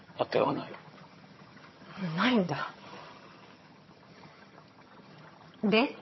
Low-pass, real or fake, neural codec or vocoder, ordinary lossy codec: 7.2 kHz; fake; vocoder, 22.05 kHz, 80 mel bands, HiFi-GAN; MP3, 24 kbps